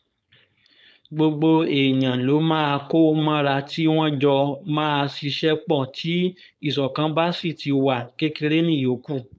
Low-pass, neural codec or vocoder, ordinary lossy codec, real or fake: none; codec, 16 kHz, 4.8 kbps, FACodec; none; fake